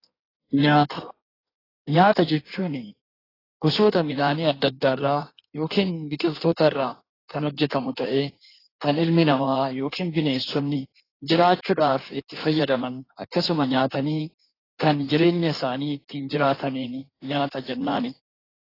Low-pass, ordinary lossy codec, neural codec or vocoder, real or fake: 5.4 kHz; AAC, 24 kbps; codec, 16 kHz in and 24 kHz out, 1.1 kbps, FireRedTTS-2 codec; fake